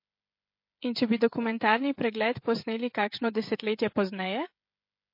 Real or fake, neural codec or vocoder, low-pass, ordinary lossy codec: fake; codec, 16 kHz, 16 kbps, FreqCodec, smaller model; 5.4 kHz; MP3, 32 kbps